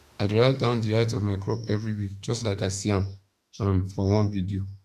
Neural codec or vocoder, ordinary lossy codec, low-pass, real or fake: autoencoder, 48 kHz, 32 numbers a frame, DAC-VAE, trained on Japanese speech; Opus, 64 kbps; 14.4 kHz; fake